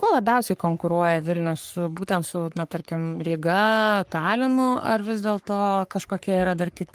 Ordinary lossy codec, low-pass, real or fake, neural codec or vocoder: Opus, 32 kbps; 14.4 kHz; fake; codec, 44.1 kHz, 3.4 kbps, Pupu-Codec